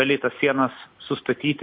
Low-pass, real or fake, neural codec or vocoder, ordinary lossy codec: 5.4 kHz; fake; vocoder, 44.1 kHz, 128 mel bands every 256 samples, BigVGAN v2; MP3, 32 kbps